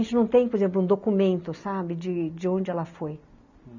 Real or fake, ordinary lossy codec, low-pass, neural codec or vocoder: real; none; 7.2 kHz; none